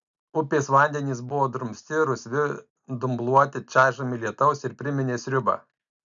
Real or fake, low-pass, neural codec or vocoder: real; 7.2 kHz; none